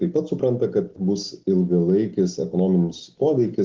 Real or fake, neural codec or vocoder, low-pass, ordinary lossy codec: real; none; 7.2 kHz; Opus, 16 kbps